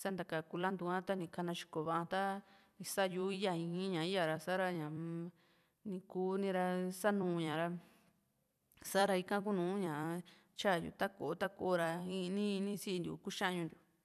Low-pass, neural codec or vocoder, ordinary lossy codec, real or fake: 14.4 kHz; vocoder, 44.1 kHz, 128 mel bands every 256 samples, BigVGAN v2; none; fake